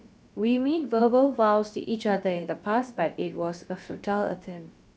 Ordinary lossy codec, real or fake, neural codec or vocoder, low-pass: none; fake; codec, 16 kHz, about 1 kbps, DyCAST, with the encoder's durations; none